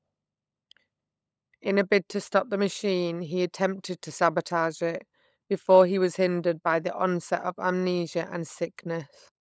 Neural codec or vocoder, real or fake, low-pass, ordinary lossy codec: codec, 16 kHz, 16 kbps, FunCodec, trained on LibriTTS, 50 frames a second; fake; none; none